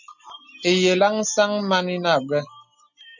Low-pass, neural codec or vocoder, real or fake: 7.2 kHz; none; real